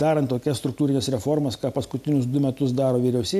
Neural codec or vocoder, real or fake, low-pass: none; real; 14.4 kHz